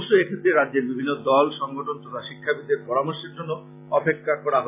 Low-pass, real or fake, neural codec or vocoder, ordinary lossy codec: 3.6 kHz; real; none; AAC, 24 kbps